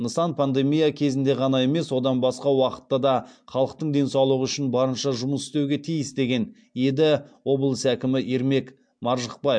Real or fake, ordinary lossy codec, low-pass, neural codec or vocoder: real; MP3, 64 kbps; 9.9 kHz; none